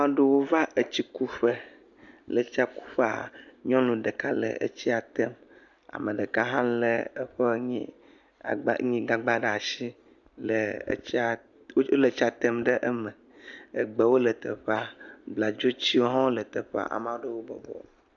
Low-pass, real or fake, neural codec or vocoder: 7.2 kHz; real; none